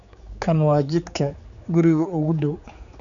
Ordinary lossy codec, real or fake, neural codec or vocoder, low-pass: none; fake; codec, 16 kHz, 4 kbps, X-Codec, HuBERT features, trained on general audio; 7.2 kHz